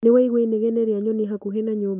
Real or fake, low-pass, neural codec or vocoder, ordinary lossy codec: real; 3.6 kHz; none; none